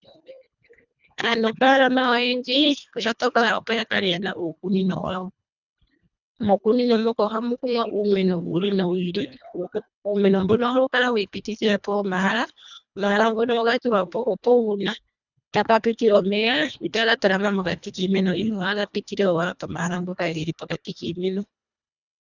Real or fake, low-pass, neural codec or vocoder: fake; 7.2 kHz; codec, 24 kHz, 1.5 kbps, HILCodec